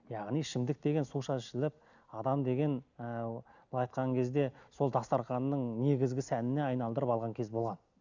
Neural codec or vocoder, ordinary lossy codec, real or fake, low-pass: none; none; real; 7.2 kHz